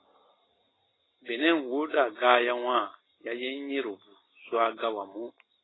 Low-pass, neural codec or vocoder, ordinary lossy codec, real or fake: 7.2 kHz; none; AAC, 16 kbps; real